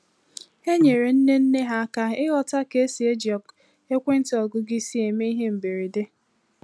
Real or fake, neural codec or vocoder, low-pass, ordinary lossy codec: real; none; none; none